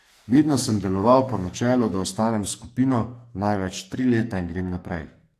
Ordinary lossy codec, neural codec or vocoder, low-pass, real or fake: AAC, 64 kbps; codec, 44.1 kHz, 2.6 kbps, SNAC; 14.4 kHz; fake